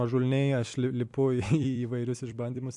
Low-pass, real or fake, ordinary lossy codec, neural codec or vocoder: 10.8 kHz; fake; AAC, 64 kbps; vocoder, 44.1 kHz, 128 mel bands every 256 samples, BigVGAN v2